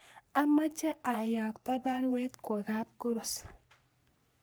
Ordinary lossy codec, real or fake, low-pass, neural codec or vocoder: none; fake; none; codec, 44.1 kHz, 3.4 kbps, Pupu-Codec